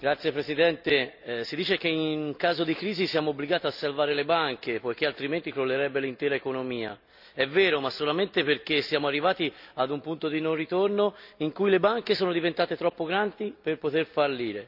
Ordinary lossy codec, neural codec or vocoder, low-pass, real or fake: none; none; 5.4 kHz; real